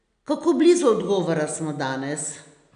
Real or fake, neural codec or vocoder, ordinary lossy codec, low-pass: real; none; none; 9.9 kHz